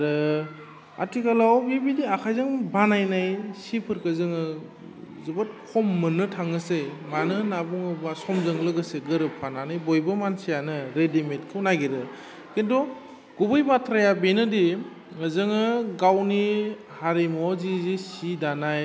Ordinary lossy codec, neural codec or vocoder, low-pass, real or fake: none; none; none; real